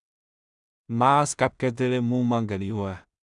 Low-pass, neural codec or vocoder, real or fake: 10.8 kHz; codec, 16 kHz in and 24 kHz out, 0.4 kbps, LongCat-Audio-Codec, two codebook decoder; fake